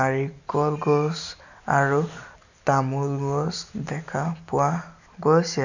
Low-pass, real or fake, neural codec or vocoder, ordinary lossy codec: 7.2 kHz; real; none; none